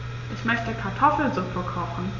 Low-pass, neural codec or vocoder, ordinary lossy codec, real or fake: 7.2 kHz; none; none; real